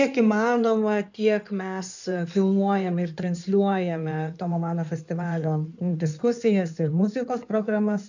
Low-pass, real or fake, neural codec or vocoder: 7.2 kHz; fake; codec, 16 kHz in and 24 kHz out, 2.2 kbps, FireRedTTS-2 codec